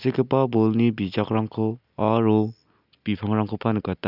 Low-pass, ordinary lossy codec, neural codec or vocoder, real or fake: 5.4 kHz; none; none; real